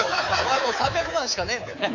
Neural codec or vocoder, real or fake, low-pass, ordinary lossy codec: codec, 24 kHz, 3.1 kbps, DualCodec; fake; 7.2 kHz; AAC, 48 kbps